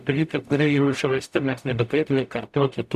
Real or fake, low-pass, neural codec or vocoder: fake; 14.4 kHz; codec, 44.1 kHz, 0.9 kbps, DAC